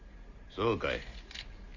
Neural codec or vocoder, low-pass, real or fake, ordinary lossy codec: none; 7.2 kHz; real; none